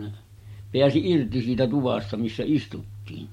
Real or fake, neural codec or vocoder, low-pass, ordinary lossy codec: real; none; 19.8 kHz; MP3, 64 kbps